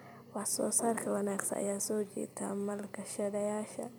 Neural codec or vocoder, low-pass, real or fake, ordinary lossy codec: vocoder, 44.1 kHz, 128 mel bands every 256 samples, BigVGAN v2; none; fake; none